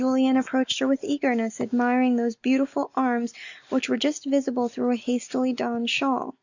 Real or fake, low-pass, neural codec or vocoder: real; 7.2 kHz; none